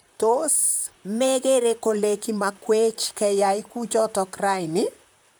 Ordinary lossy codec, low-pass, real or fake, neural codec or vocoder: none; none; fake; vocoder, 44.1 kHz, 128 mel bands, Pupu-Vocoder